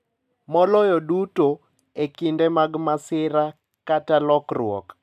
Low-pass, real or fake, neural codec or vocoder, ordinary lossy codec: 14.4 kHz; real; none; none